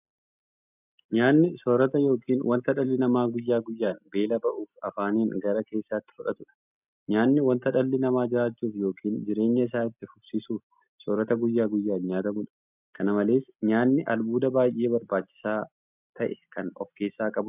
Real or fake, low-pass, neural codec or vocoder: real; 3.6 kHz; none